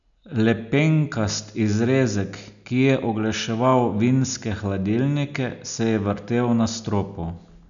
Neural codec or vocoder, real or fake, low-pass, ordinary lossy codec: none; real; 7.2 kHz; none